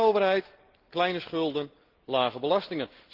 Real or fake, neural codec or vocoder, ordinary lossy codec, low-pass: real; none; Opus, 16 kbps; 5.4 kHz